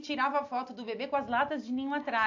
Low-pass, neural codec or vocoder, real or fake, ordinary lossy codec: 7.2 kHz; none; real; AAC, 48 kbps